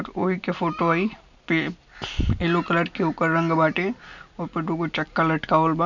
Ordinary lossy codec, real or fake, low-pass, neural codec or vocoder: none; real; 7.2 kHz; none